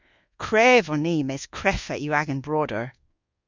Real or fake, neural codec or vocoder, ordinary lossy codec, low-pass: fake; codec, 24 kHz, 1.2 kbps, DualCodec; Opus, 64 kbps; 7.2 kHz